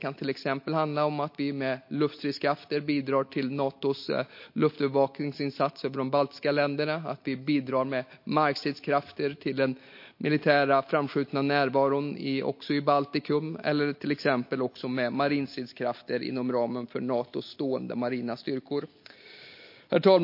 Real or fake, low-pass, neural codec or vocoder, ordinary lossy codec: real; 5.4 kHz; none; MP3, 32 kbps